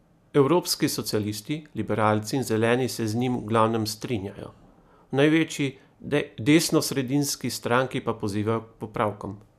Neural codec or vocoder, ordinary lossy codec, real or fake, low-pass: none; none; real; 14.4 kHz